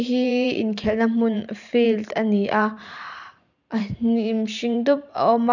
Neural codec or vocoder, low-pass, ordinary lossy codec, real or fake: vocoder, 44.1 kHz, 128 mel bands every 512 samples, BigVGAN v2; 7.2 kHz; none; fake